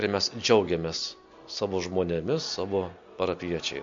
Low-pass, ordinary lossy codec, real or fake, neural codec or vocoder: 7.2 kHz; MP3, 48 kbps; real; none